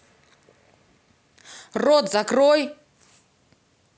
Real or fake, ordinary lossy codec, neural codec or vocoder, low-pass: real; none; none; none